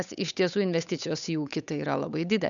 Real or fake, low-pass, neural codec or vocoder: fake; 7.2 kHz; codec, 16 kHz, 8 kbps, FunCodec, trained on Chinese and English, 25 frames a second